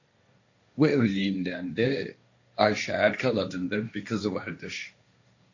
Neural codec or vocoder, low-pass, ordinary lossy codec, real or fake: codec, 16 kHz, 1.1 kbps, Voila-Tokenizer; 7.2 kHz; AAC, 48 kbps; fake